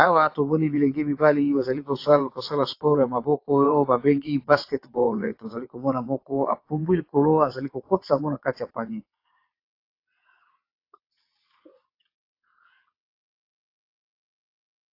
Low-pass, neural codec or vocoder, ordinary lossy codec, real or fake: 5.4 kHz; vocoder, 22.05 kHz, 80 mel bands, WaveNeXt; AAC, 32 kbps; fake